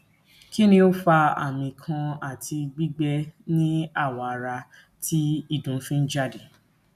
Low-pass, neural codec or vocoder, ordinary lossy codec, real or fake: 14.4 kHz; none; none; real